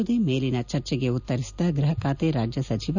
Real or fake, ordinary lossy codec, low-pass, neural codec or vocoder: real; none; 7.2 kHz; none